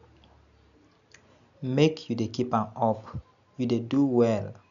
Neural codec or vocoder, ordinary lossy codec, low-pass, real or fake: none; none; 7.2 kHz; real